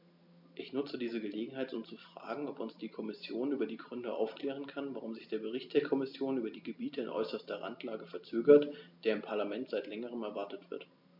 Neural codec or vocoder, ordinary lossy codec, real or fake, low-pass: none; MP3, 48 kbps; real; 5.4 kHz